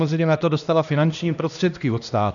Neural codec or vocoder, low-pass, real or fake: codec, 16 kHz, 1 kbps, X-Codec, HuBERT features, trained on LibriSpeech; 7.2 kHz; fake